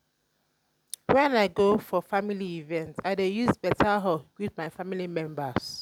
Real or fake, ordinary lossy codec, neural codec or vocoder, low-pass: real; none; none; 19.8 kHz